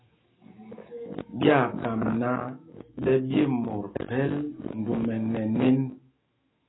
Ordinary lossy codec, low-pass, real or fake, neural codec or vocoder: AAC, 16 kbps; 7.2 kHz; fake; autoencoder, 48 kHz, 128 numbers a frame, DAC-VAE, trained on Japanese speech